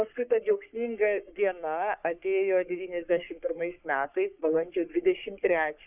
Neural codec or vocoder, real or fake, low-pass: codec, 44.1 kHz, 3.4 kbps, Pupu-Codec; fake; 3.6 kHz